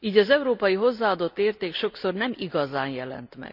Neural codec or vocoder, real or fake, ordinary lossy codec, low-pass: none; real; none; 5.4 kHz